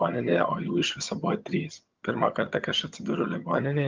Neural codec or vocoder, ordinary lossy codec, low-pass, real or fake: vocoder, 22.05 kHz, 80 mel bands, HiFi-GAN; Opus, 24 kbps; 7.2 kHz; fake